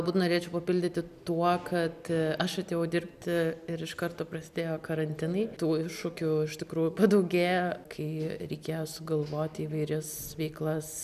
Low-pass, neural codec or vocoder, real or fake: 14.4 kHz; none; real